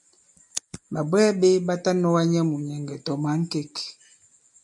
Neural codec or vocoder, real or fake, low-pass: none; real; 10.8 kHz